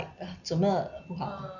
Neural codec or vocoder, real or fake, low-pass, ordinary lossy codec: none; real; 7.2 kHz; none